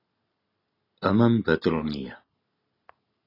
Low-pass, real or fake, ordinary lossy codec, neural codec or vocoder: 5.4 kHz; real; AAC, 24 kbps; none